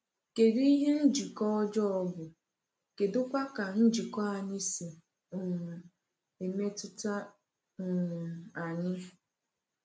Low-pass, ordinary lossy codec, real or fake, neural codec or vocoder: none; none; real; none